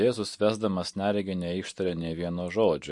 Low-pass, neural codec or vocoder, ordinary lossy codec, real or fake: 10.8 kHz; none; MP3, 48 kbps; real